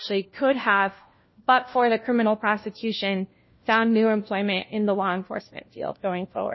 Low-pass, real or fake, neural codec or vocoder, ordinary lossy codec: 7.2 kHz; fake; codec, 16 kHz, 1 kbps, FunCodec, trained on LibriTTS, 50 frames a second; MP3, 24 kbps